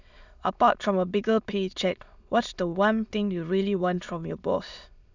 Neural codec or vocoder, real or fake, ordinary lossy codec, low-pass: autoencoder, 22.05 kHz, a latent of 192 numbers a frame, VITS, trained on many speakers; fake; none; 7.2 kHz